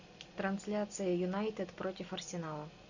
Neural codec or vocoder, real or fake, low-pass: none; real; 7.2 kHz